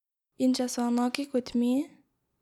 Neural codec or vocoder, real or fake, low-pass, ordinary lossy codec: none; real; 19.8 kHz; none